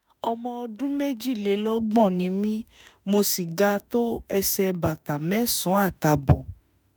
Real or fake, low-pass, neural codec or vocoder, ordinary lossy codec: fake; none; autoencoder, 48 kHz, 32 numbers a frame, DAC-VAE, trained on Japanese speech; none